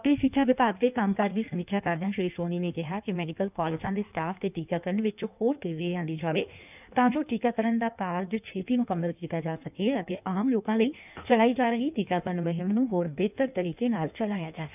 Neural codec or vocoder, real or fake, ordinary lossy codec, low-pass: codec, 16 kHz in and 24 kHz out, 1.1 kbps, FireRedTTS-2 codec; fake; none; 3.6 kHz